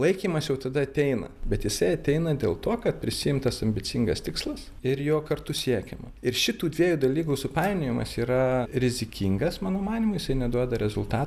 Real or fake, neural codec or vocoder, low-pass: real; none; 14.4 kHz